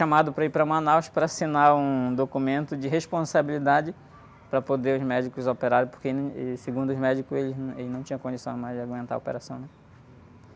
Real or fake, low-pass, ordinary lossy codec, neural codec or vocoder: real; none; none; none